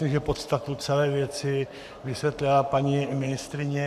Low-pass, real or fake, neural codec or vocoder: 14.4 kHz; fake; codec, 44.1 kHz, 7.8 kbps, Pupu-Codec